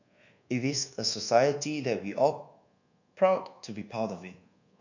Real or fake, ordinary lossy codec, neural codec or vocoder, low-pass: fake; none; codec, 24 kHz, 1.2 kbps, DualCodec; 7.2 kHz